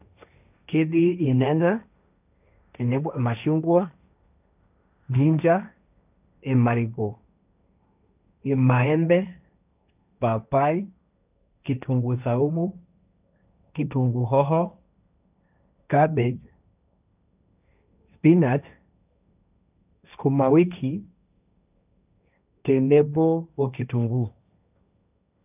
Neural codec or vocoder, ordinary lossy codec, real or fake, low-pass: codec, 16 kHz, 1.1 kbps, Voila-Tokenizer; none; fake; 3.6 kHz